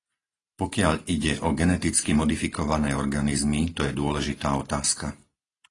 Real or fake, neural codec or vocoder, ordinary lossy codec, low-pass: real; none; AAC, 32 kbps; 10.8 kHz